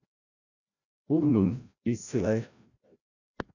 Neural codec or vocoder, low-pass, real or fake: codec, 16 kHz, 0.5 kbps, FreqCodec, larger model; 7.2 kHz; fake